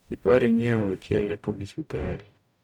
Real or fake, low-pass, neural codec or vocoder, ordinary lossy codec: fake; 19.8 kHz; codec, 44.1 kHz, 0.9 kbps, DAC; none